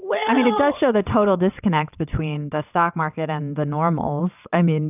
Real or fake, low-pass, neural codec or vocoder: fake; 3.6 kHz; vocoder, 22.05 kHz, 80 mel bands, WaveNeXt